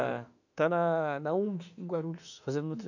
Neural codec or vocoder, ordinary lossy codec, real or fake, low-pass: autoencoder, 48 kHz, 32 numbers a frame, DAC-VAE, trained on Japanese speech; none; fake; 7.2 kHz